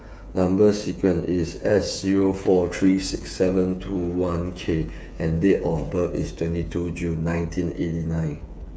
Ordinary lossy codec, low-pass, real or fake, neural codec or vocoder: none; none; fake; codec, 16 kHz, 8 kbps, FreqCodec, smaller model